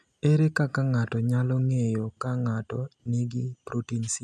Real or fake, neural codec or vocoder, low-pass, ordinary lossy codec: real; none; none; none